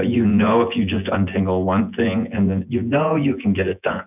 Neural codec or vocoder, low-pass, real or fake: vocoder, 24 kHz, 100 mel bands, Vocos; 3.6 kHz; fake